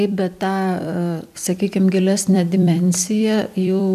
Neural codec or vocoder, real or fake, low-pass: vocoder, 44.1 kHz, 128 mel bands every 256 samples, BigVGAN v2; fake; 14.4 kHz